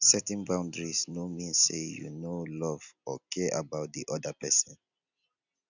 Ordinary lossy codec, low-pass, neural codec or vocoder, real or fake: none; 7.2 kHz; none; real